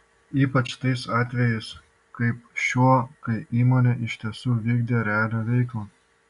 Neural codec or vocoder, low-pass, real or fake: none; 10.8 kHz; real